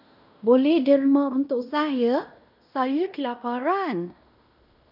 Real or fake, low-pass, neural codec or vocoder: fake; 5.4 kHz; codec, 16 kHz in and 24 kHz out, 0.9 kbps, LongCat-Audio-Codec, fine tuned four codebook decoder